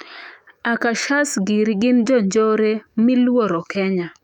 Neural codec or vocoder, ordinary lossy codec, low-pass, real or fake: autoencoder, 48 kHz, 128 numbers a frame, DAC-VAE, trained on Japanese speech; none; 19.8 kHz; fake